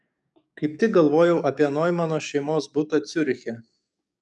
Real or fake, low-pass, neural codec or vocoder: fake; 10.8 kHz; codec, 44.1 kHz, 7.8 kbps, DAC